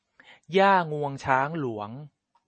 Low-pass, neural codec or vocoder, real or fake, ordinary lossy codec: 9.9 kHz; none; real; MP3, 32 kbps